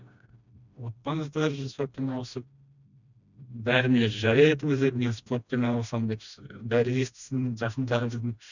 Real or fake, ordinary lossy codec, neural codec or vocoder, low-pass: fake; none; codec, 16 kHz, 1 kbps, FreqCodec, smaller model; 7.2 kHz